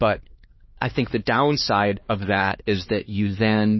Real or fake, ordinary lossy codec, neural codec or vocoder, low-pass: fake; MP3, 24 kbps; codec, 16 kHz, 2 kbps, X-Codec, HuBERT features, trained on LibriSpeech; 7.2 kHz